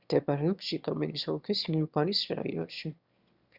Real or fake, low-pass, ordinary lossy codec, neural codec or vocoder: fake; 5.4 kHz; Opus, 64 kbps; autoencoder, 22.05 kHz, a latent of 192 numbers a frame, VITS, trained on one speaker